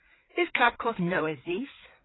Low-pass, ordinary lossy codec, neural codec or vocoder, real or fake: 7.2 kHz; AAC, 16 kbps; codec, 16 kHz, 4 kbps, FreqCodec, larger model; fake